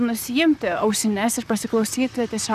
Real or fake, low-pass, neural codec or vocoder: fake; 14.4 kHz; vocoder, 44.1 kHz, 128 mel bands, Pupu-Vocoder